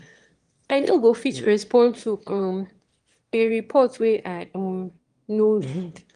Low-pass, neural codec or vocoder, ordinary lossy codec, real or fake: 9.9 kHz; autoencoder, 22.05 kHz, a latent of 192 numbers a frame, VITS, trained on one speaker; Opus, 24 kbps; fake